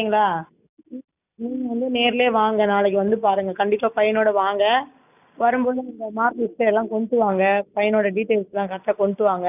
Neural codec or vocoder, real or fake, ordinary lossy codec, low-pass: none; real; none; 3.6 kHz